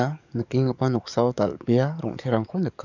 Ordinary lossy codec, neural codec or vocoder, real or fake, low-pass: none; codec, 44.1 kHz, 7.8 kbps, DAC; fake; 7.2 kHz